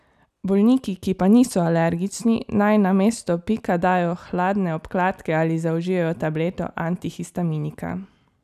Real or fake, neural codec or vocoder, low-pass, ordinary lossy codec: real; none; 14.4 kHz; none